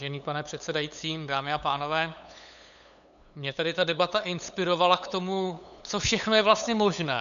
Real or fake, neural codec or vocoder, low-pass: fake; codec, 16 kHz, 8 kbps, FunCodec, trained on LibriTTS, 25 frames a second; 7.2 kHz